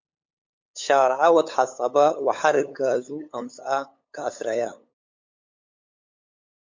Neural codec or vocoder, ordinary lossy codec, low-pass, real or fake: codec, 16 kHz, 8 kbps, FunCodec, trained on LibriTTS, 25 frames a second; MP3, 48 kbps; 7.2 kHz; fake